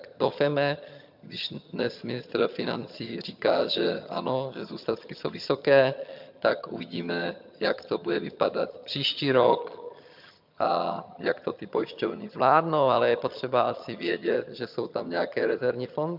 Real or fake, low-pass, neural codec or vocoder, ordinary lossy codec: fake; 5.4 kHz; vocoder, 22.05 kHz, 80 mel bands, HiFi-GAN; MP3, 48 kbps